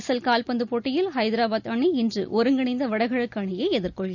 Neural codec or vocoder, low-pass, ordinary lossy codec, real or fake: none; 7.2 kHz; none; real